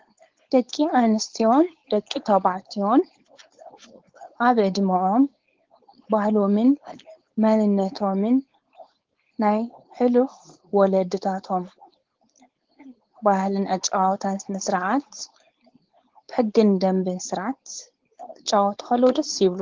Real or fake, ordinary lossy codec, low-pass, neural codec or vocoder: fake; Opus, 16 kbps; 7.2 kHz; codec, 16 kHz, 4.8 kbps, FACodec